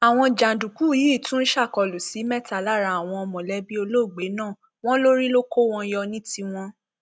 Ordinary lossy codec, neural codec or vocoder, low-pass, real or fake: none; none; none; real